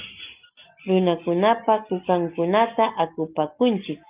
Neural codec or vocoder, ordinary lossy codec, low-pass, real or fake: none; Opus, 24 kbps; 3.6 kHz; real